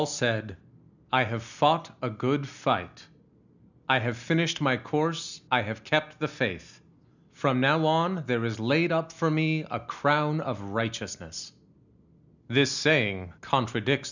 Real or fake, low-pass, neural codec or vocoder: real; 7.2 kHz; none